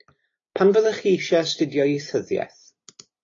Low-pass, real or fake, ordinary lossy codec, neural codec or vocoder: 7.2 kHz; real; AAC, 32 kbps; none